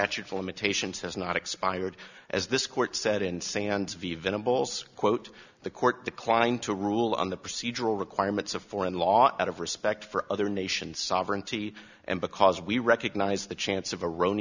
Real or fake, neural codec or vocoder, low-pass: real; none; 7.2 kHz